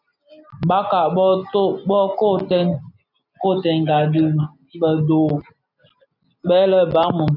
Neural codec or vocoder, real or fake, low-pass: none; real; 5.4 kHz